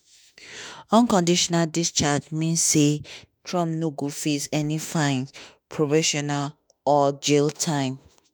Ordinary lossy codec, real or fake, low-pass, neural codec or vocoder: none; fake; none; autoencoder, 48 kHz, 32 numbers a frame, DAC-VAE, trained on Japanese speech